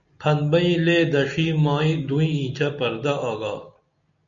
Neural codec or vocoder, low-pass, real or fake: none; 7.2 kHz; real